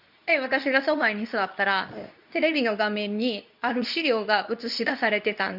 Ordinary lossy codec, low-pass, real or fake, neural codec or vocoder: none; 5.4 kHz; fake; codec, 24 kHz, 0.9 kbps, WavTokenizer, medium speech release version 2